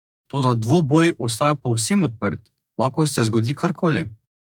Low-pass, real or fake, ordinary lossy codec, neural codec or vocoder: 19.8 kHz; fake; none; codec, 44.1 kHz, 2.6 kbps, DAC